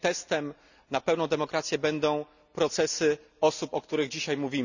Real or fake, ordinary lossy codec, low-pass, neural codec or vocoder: real; none; 7.2 kHz; none